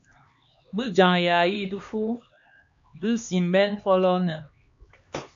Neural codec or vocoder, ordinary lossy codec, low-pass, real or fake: codec, 16 kHz, 2 kbps, X-Codec, HuBERT features, trained on LibriSpeech; MP3, 48 kbps; 7.2 kHz; fake